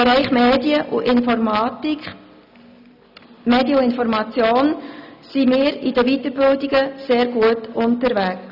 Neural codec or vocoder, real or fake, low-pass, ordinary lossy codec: none; real; 5.4 kHz; none